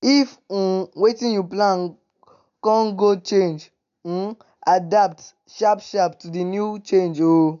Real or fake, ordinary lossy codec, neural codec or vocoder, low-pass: real; none; none; 7.2 kHz